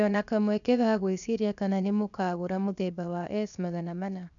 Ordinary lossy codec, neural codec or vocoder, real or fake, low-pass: none; codec, 16 kHz, 0.7 kbps, FocalCodec; fake; 7.2 kHz